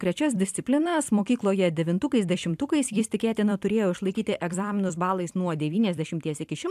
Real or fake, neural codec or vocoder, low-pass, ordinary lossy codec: fake; vocoder, 44.1 kHz, 128 mel bands every 256 samples, BigVGAN v2; 14.4 kHz; AAC, 96 kbps